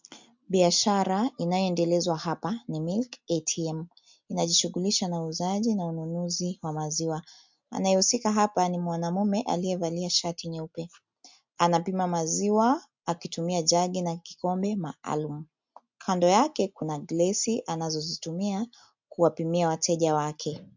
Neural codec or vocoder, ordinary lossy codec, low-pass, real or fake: none; MP3, 64 kbps; 7.2 kHz; real